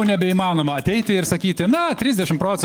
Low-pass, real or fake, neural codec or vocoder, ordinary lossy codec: 19.8 kHz; fake; codec, 44.1 kHz, 7.8 kbps, DAC; Opus, 32 kbps